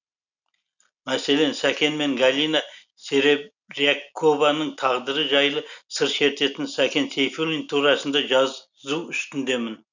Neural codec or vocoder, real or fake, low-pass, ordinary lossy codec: none; real; 7.2 kHz; none